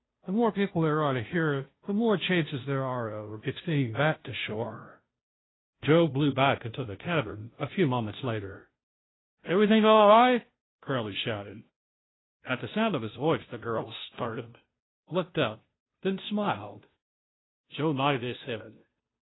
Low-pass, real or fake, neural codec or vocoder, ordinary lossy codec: 7.2 kHz; fake; codec, 16 kHz, 0.5 kbps, FunCodec, trained on Chinese and English, 25 frames a second; AAC, 16 kbps